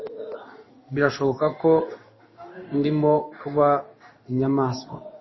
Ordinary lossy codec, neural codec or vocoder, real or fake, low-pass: MP3, 24 kbps; codec, 16 kHz in and 24 kHz out, 1 kbps, XY-Tokenizer; fake; 7.2 kHz